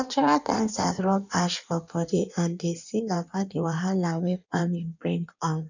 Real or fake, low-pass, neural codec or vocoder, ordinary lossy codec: fake; 7.2 kHz; codec, 16 kHz in and 24 kHz out, 1.1 kbps, FireRedTTS-2 codec; none